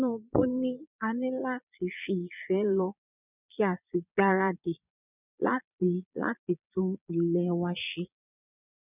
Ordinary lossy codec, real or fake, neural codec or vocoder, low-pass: none; fake; vocoder, 22.05 kHz, 80 mel bands, Vocos; 3.6 kHz